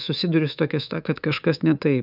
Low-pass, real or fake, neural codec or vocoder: 5.4 kHz; fake; codec, 24 kHz, 3.1 kbps, DualCodec